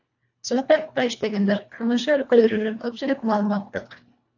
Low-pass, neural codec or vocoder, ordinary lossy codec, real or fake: 7.2 kHz; codec, 24 kHz, 1.5 kbps, HILCodec; AAC, 48 kbps; fake